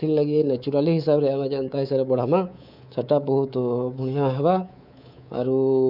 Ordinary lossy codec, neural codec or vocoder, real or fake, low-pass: none; codec, 24 kHz, 3.1 kbps, DualCodec; fake; 5.4 kHz